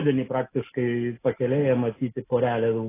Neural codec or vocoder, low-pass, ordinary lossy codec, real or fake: none; 3.6 kHz; AAC, 16 kbps; real